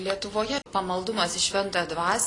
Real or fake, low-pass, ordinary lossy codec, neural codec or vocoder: real; 10.8 kHz; AAC, 32 kbps; none